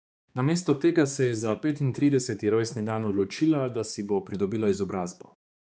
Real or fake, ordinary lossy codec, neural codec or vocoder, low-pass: fake; none; codec, 16 kHz, 2 kbps, X-Codec, HuBERT features, trained on balanced general audio; none